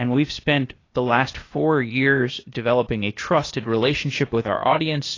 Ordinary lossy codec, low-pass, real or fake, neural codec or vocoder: AAC, 32 kbps; 7.2 kHz; fake; codec, 16 kHz, 0.8 kbps, ZipCodec